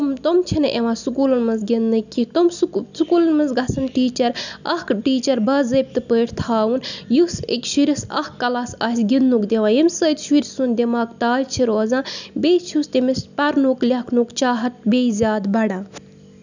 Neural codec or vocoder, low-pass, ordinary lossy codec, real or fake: none; 7.2 kHz; none; real